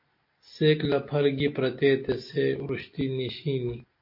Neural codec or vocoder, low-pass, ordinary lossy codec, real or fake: none; 5.4 kHz; MP3, 32 kbps; real